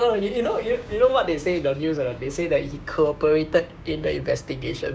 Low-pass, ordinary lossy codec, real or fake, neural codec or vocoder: none; none; fake; codec, 16 kHz, 6 kbps, DAC